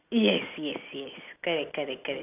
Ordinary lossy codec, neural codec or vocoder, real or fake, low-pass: none; none; real; 3.6 kHz